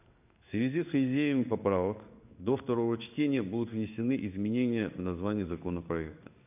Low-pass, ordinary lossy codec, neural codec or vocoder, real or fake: 3.6 kHz; none; codec, 16 kHz in and 24 kHz out, 1 kbps, XY-Tokenizer; fake